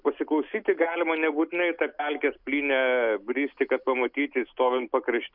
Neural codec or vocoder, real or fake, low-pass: none; real; 5.4 kHz